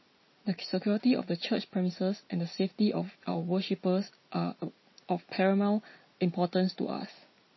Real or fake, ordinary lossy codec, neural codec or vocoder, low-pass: real; MP3, 24 kbps; none; 7.2 kHz